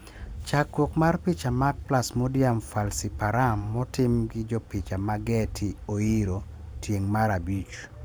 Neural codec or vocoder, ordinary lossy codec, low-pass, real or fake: vocoder, 44.1 kHz, 128 mel bands every 512 samples, BigVGAN v2; none; none; fake